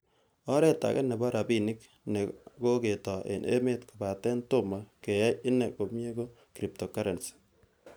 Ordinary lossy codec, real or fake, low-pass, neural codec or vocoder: none; real; none; none